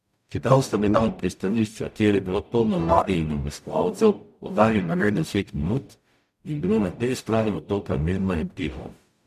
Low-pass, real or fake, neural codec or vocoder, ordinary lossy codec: 14.4 kHz; fake; codec, 44.1 kHz, 0.9 kbps, DAC; MP3, 96 kbps